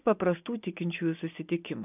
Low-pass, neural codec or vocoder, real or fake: 3.6 kHz; none; real